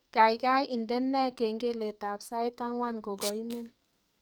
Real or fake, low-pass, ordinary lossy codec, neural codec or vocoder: fake; none; none; codec, 44.1 kHz, 2.6 kbps, SNAC